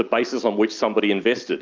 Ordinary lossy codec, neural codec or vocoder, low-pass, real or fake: Opus, 24 kbps; none; 7.2 kHz; real